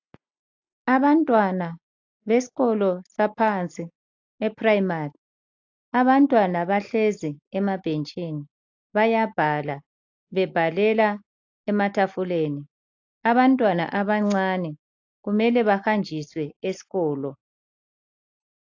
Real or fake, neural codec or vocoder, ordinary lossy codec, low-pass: real; none; AAC, 48 kbps; 7.2 kHz